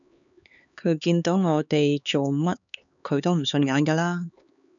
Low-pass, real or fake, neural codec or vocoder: 7.2 kHz; fake; codec, 16 kHz, 4 kbps, X-Codec, HuBERT features, trained on LibriSpeech